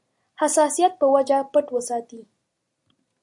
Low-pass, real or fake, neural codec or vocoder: 10.8 kHz; real; none